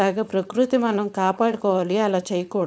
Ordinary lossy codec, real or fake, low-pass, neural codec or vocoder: none; fake; none; codec, 16 kHz, 4.8 kbps, FACodec